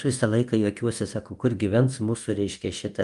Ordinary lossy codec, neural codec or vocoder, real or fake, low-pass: Opus, 32 kbps; codec, 24 kHz, 0.9 kbps, DualCodec; fake; 10.8 kHz